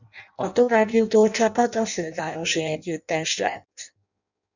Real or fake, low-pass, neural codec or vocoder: fake; 7.2 kHz; codec, 16 kHz in and 24 kHz out, 0.6 kbps, FireRedTTS-2 codec